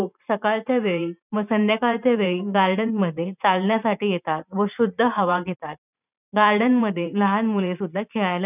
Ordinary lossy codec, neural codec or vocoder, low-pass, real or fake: none; vocoder, 44.1 kHz, 128 mel bands every 512 samples, BigVGAN v2; 3.6 kHz; fake